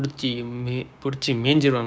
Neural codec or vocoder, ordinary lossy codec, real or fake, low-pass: codec, 16 kHz, 6 kbps, DAC; none; fake; none